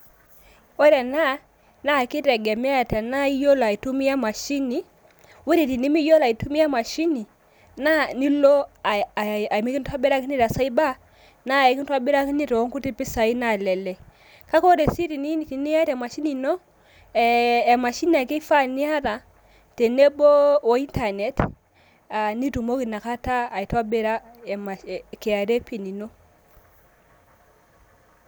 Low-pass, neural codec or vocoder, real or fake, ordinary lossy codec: none; none; real; none